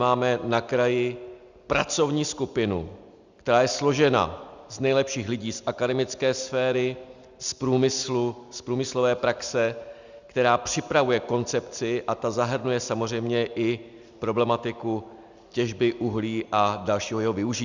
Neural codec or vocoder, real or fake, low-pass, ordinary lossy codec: none; real; 7.2 kHz; Opus, 64 kbps